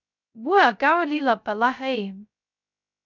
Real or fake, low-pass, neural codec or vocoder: fake; 7.2 kHz; codec, 16 kHz, 0.2 kbps, FocalCodec